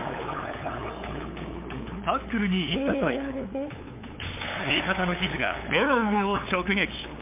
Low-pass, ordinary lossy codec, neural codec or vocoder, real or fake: 3.6 kHz; MP3, 24 kbps; codec, 16 kHz, 8 kbps, FunCodec, trained on LibriTTS, 25 frames a second; fake